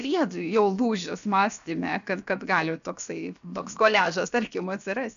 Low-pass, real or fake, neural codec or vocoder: 7.2 kHz; fake; codec, 16 kHz, about 1 kbps, DyCAST, with the encoder's durations